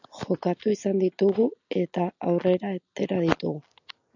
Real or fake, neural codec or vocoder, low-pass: real; none; 7.2 kHz